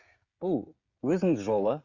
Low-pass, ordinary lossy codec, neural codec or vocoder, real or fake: 7.2 kHz; Opus, 64 kbps; codec, 16 kHz, 16 kbps, FreqCodec, smaller model; fake